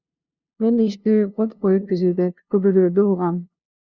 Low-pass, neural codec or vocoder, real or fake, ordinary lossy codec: 7.2 kHz; codec, 16 kHz, 0.5 kbps, FunCodec, trained on LibriTTS, 25 frames a second; fake; Opus, 64 kbps